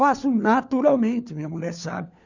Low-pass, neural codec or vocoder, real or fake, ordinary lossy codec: 7.2 kHz; codec, 16 kHz, 4 kbps, FunCodec, trained on LibriTTS, 50 frames a second; fake; none